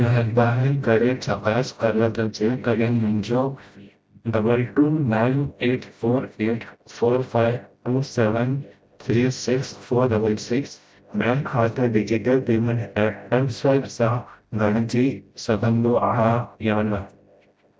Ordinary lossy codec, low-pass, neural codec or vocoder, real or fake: none; none; codec, 16 kHz, 0.5 kbps, FreqCodec, smaller model; fake